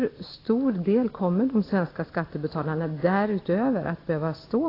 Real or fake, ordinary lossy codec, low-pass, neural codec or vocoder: real; AAC, 24 kbps; 5.4 kHz; none